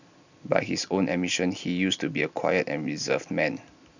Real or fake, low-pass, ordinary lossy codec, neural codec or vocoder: real; 7.2 kHz; none; none